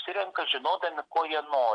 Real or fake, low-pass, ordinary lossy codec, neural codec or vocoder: real; 9.9 kHz; AAC, 64 kbps; none